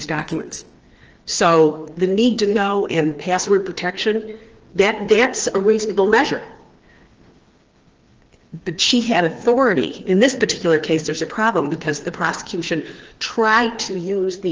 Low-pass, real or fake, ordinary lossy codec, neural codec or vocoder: 7.2 kHz; fake; Opus, 16 kbps; codec, 16 kHz, 2 kbps, FreqCodec, larger model